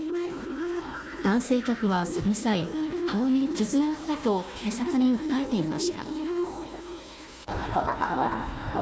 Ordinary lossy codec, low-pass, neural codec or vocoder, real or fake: none; none; codec, 16 kHz, 1 kbps, FunCodec, trained on Chinese and English, 50 frames a second; fake